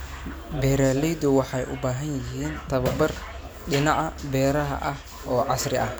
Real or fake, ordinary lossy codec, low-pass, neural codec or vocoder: real; none; none; none